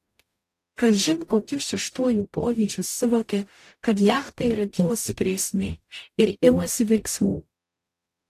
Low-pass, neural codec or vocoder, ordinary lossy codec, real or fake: 14.4 kHz; codec, 44.1 kHz, 0.9 kbps, DAC; AAC, 64 kbps; fake